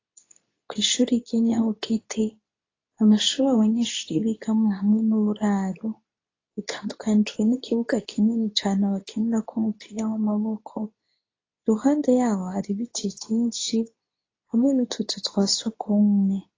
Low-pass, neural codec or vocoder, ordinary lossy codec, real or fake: 7.2 kHz; codec, 24 kHz, 0.9 kbps, WavTokenizer, medium speech release version 2; AAC, 32 kbps; fake